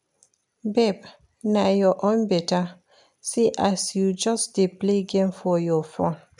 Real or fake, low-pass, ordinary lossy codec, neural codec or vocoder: real; 10.8 kHz; none; none